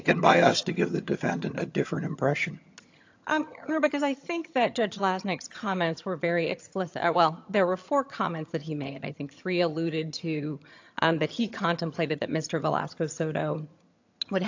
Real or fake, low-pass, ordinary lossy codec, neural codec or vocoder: fake; 7.2 kHz; AAC, 48 kbps; vocoder, 22.05 kHz, 80 mel bands, HiFi-GAN